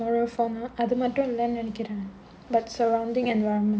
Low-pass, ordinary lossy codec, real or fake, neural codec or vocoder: none; none; real; none